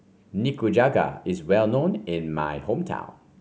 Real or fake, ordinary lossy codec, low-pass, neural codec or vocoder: real; none; none; none